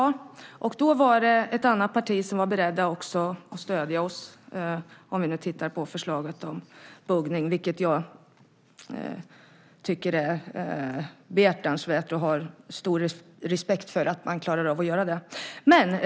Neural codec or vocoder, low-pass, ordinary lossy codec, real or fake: none; none; none; real